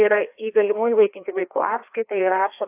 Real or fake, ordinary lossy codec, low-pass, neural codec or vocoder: fake; AAC, 32 kbps; 3.6 kHz; codec, 16 kHz, 2 kbps, FreqCodec, larger model